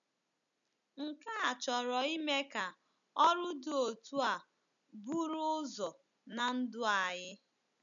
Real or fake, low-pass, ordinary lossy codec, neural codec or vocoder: real; 7.2 kHz; none; none